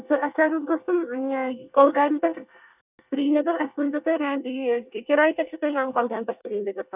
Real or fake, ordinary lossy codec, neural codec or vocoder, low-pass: fake; none; codec, 24 kHz, 1 kbps, SNAC; 3.6 kHz